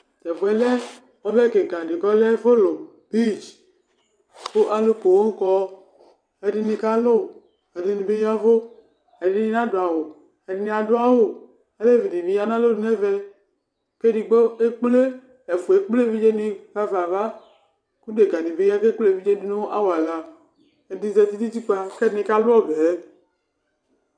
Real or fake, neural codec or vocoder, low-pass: fake; vocoder, 22.05 kHz, 80 mel bands, WaveNeXt; 9.9 kHz